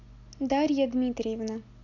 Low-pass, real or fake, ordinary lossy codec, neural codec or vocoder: 7.2 kHz; real; none; none